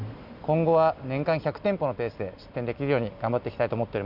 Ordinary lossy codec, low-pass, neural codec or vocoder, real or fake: none; 5.4 kHz; none; real